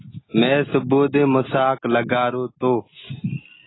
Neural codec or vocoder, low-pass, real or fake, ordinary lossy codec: none; 7.2 kHz; real; AAC, 16 kbps